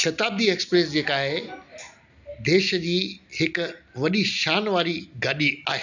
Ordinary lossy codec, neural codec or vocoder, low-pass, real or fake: none; none; 7.2 kHz; real